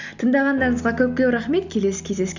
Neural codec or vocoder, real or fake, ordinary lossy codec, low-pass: none; real; none; 7.2 kHz